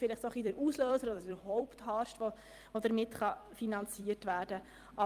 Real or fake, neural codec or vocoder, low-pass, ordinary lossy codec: fake; vocoder, 44.1 kHz, 128 mel bands every 256 samples, BigVGAN v2; 14.4 kHz; Opus, 32 kbps